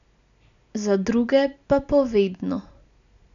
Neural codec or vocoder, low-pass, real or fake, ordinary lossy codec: none; 7.2 kHz; real; MP3, 96 kbps